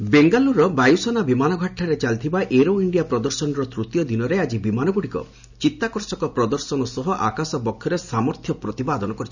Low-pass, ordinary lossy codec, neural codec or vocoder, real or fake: 7.2 kHz; none; none; real